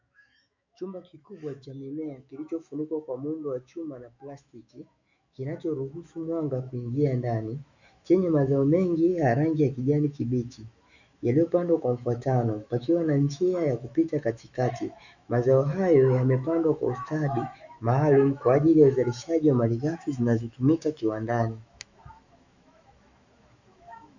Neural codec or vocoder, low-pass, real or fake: autoencoder, 48 kHz, 128 numbers a frame, DAC-VAE, trained on Japanese speech; 7.2 kHz; fake